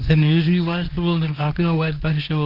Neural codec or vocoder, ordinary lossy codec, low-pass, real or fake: codec, 24 kHz, 0.9 kbps, WavTokenizer, medium speech release version 1; Opus, 24 kbps; 5.4 kHz; fake